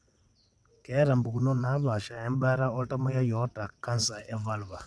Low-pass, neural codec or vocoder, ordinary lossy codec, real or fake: none; vocoder, 22.05 kHz, 80 mel bands, WaveNeXt; none; fake